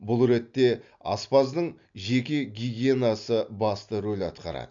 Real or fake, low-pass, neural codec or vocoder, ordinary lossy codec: real; 7.2 kHz; none; AAC, 64 kbps